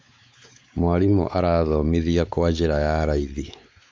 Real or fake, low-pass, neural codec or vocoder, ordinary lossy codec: fake; none; codec, 16 kHz, 4 kbps, X-Codec, WavLM features, trained on Multilingual LibriSpeech; none